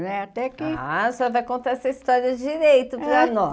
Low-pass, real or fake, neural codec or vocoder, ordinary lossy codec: none; real; none; none